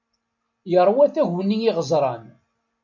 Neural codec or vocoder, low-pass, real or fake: none; 7.2 kHz; real